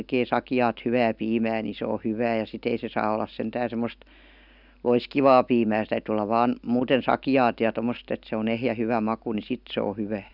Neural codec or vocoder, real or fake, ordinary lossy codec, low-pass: none; real; none; 5.4 kHz